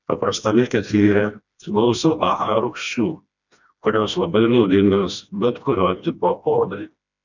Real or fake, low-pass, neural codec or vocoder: fake; 7.2 kHz; codec, 16 kHz, 1 kbps, FreqCodec, smaller model